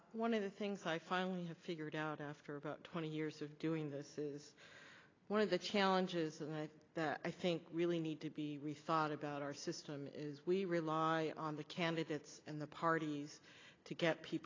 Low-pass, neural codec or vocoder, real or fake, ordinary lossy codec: 7.2 kHz; none; real; AAC, 32 kbps